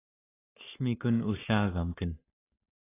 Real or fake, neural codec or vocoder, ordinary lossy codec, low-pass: fake; codec, 44.1 kHz, 7.8 kbps, Pupu-Codec; AAC, 16 kbps; 3.6 kHz